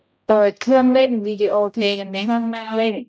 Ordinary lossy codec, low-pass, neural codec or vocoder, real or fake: none; none; codec, 16 kHz, 0.5 kbps, X-Codec, HuBERT features, trained on general audio; fake